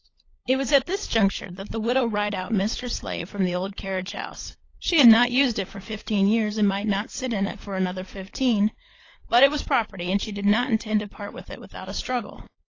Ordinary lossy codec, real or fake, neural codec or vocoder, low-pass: AAC, 32 kbps; fake; codec, 16 kHz, 16 kbps, FunCodec, trained on LibriTTS, 50 frames a second; 7.2 kHz